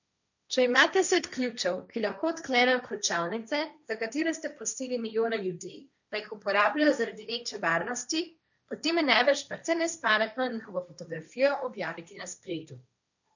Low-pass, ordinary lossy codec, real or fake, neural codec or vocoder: 7.2 kHz; none; fake; codec, 16 kHz, 1.1 kbps, Voila-Tokenizer